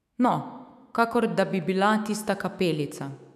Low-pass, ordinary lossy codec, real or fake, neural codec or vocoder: 14.4 kHz; none; fake; autoencoder, 48 kHz, 128 numbers a frame, DAC-VAE, trained on Japanese speech